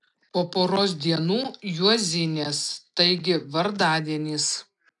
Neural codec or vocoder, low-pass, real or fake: none; 10.8 kHz; real